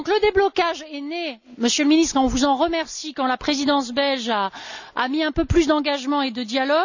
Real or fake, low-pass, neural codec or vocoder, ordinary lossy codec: real; 7.2 kHz; none; none